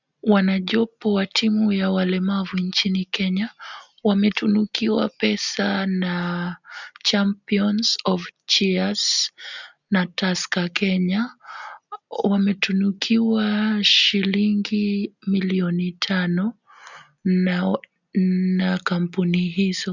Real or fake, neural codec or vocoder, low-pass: real; none; 7.2 kHz